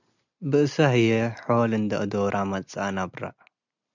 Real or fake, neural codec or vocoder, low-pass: real; none; 7.2 kHz